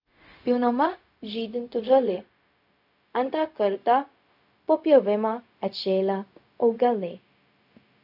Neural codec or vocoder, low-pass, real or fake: codec, 16 kHz, 0.4 kbps, LongCat-Audio-Codec; 5.4 kHz; fake